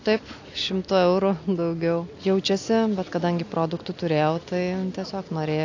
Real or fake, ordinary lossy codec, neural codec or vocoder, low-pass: real; AAC, 48 kbps; none; 7.2 kHz